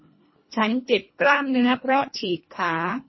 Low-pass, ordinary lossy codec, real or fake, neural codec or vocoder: 7.2 kHz; MP3, 24 kbps; fake; codec, 24 kHz, 1.5 kbps, HILCodec